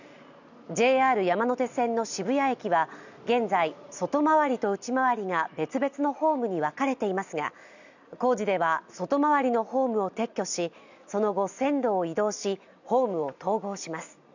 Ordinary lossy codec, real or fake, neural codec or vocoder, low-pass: none; real; none; 7.2 kHz